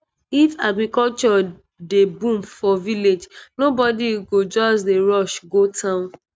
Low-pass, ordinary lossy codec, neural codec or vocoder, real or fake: none; none; none; real